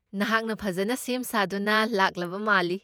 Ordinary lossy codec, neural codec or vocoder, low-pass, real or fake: none; vocoder, 48 kHz, 128 mel bands, Vocos; none; fake